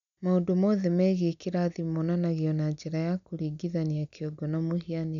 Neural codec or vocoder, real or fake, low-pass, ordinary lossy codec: none; real; 7.2 kHz; none